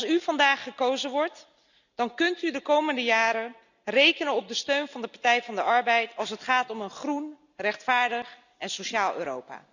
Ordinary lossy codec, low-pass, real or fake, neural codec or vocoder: none; 7.2 kHz; real; none